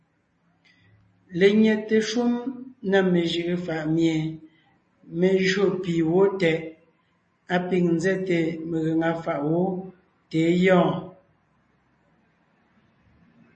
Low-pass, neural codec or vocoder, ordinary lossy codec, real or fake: 10.8 kHz; none; MP3, 32 kbps; real